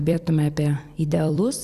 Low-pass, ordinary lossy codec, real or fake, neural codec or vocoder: 14.4 kHz; Opus, 64 kbps; fake; vocoder, 44.1 kHz, 128 mel bands every 256 samples, BigVGAN v2